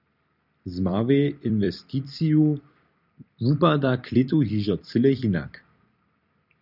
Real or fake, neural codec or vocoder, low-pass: fake; vocoder, 44.1 kHz, 128 mel bands every 256 samples, BigVGAN v2; 5.4 kHz